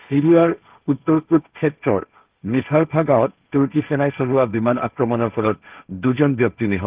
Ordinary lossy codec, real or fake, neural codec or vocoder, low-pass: Opus, 24 kbps; fake; codec, 16 kHz, 1.1 kbps, Voila-Tokenizer; 3.6 kHz